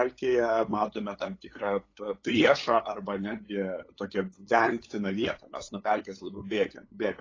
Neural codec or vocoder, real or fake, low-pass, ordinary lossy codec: codec, 16 kHz, 8 kbps, FunCodec, trained on LibriTTS, 25 frames a second; fake; 7.2 kHz; AAC, 32 kbps